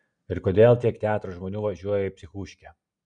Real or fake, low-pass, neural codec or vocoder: real; 9.9 kHz; none